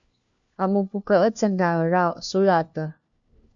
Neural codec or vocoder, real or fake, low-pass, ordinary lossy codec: codec, 16 kHz, 1 kbps, FunCodec, trained on LibriTTS, 50 frames a second; fake; 7.2 kHz; MP3, 64 kbps